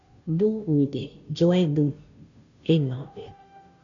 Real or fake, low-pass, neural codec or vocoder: fake; 7.2 kHz; codec, 16 kHz, 0.5 kbps, FunCodec, trained on Chinese and English, 25 frames a second